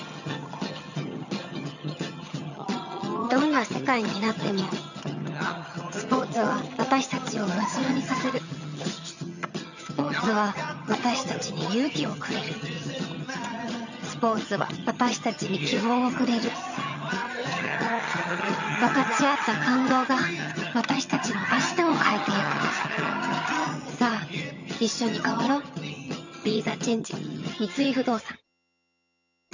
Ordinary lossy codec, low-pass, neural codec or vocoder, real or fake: none; 7.2 kHz; vocoder, 22.05 kHz, 80 mel bands, HiFi-GAN; fake